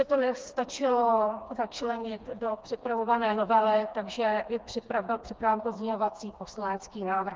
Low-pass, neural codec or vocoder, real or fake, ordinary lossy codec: 7.2 kHz; codec, 16 kHz, 2 kbps, FreqCodec, smaller model; fake; Opus, 24 kbps